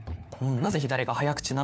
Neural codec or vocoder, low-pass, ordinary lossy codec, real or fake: codec, 16 kHz, 4 kbps, FunCodec, trained on LibriTTS, 50 frames a second; none; none; fake